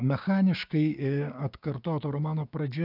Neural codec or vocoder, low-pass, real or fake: codec, 16 kHz, 6 kbps, DAC; 5.4 kHz; fake